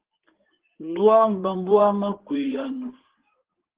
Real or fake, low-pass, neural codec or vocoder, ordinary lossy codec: fake; 3.6 kHz; codec, 16 kHz in and 24 kHz out, 2.2 kbps, FireRedTTS-2 codec; Opus, 16 kbps